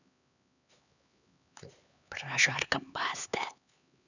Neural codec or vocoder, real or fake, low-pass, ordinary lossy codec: codec, 16 kHz, 4 kbps, X-Codec, HuBERT features, trained on LibriSpeech; fake; 7.2 kHz; none